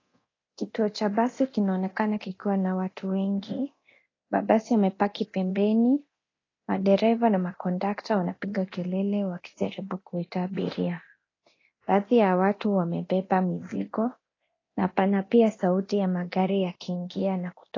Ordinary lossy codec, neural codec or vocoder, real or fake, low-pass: AAC, 32 kbps; codec, 24 kHz, 0.9 kbps, DualCodec; fake; 7.2 kHz